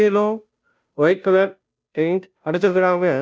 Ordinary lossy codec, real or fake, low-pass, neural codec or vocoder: none; fake; none; codec, 16 kHz, 0.5 kbps, FunCodec, trained on Chinese and English, 25 frames a second